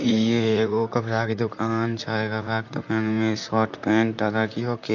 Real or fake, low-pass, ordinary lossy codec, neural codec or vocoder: fake; 7.2 kHz; none; vocoder, 44.1 kHz, 128 mel bands, Pupu-Vocoder